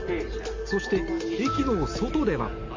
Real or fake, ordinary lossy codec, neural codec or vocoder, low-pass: real; MP3, 48 kbps; none; 7.2 kHz